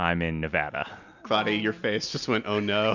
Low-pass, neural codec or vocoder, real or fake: 7.2 kHz; none; real